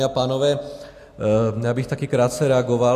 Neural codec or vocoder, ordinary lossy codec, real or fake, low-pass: none; AAC, 64 kbps; real; 14.4 kHz